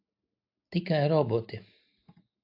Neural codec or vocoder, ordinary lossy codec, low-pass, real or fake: none; AAC, 32 kbps; 5.4 kHz; real